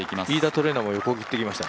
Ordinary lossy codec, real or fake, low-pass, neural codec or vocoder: none; real; none; none